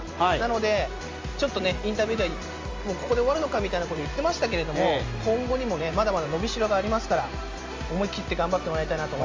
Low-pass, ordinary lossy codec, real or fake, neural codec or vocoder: 7.2 kHz; Opus, 32 kbps; real; none